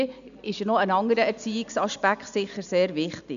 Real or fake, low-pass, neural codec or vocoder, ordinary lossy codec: real; 7.2 kHz; none; none